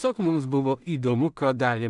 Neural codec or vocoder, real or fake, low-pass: codec, 16 kHz in and 24 kHz out, 0.4 kbps, LongCat-Audio-Codec, two codebook decoder; fake; 10.8 kHz